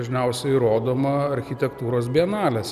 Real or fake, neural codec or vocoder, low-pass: real; none; 14.4 kHz